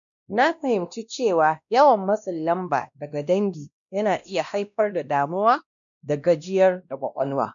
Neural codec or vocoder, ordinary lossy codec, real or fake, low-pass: codec, 16 kHz, 1 kbps, X-Codec, WavLM features, trained on Multilingual LibriSpeech; none; fake; 7.2 kHz